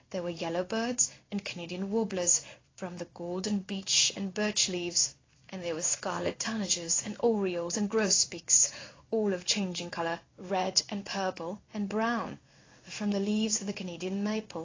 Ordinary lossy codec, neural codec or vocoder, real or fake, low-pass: AAC, 32 kbps; codec, 16 kHz in and 24 kHz out, 1 kbps, XY-Tokenizer; fake; 7.2 kHz